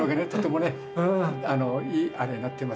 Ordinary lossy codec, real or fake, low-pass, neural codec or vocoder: none; real; none; none